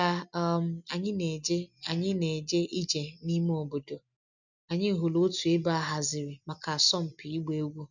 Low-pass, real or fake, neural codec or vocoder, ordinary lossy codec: 7.2 kHz; real; none; none